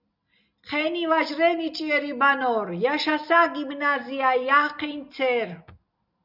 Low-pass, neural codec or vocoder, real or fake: 5.4 kHz; none; real